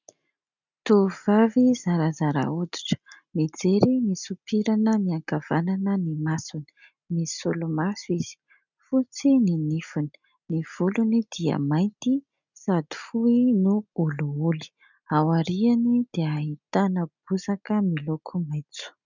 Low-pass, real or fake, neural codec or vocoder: 7.2 kHz; real; none